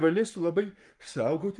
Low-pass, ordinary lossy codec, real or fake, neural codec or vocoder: 10.8 kHz; Opus, 32 kbps; fake; codec, 44.1 kHz, 7.8 kbps, Pupu-Codec